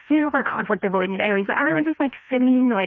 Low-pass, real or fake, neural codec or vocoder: 7.2 kHz; fake; codec, 16 kHz, 1 kbps, FreqCodec, larger model